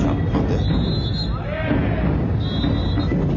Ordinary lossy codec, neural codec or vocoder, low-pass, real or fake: none; none; 7.2 kHz; real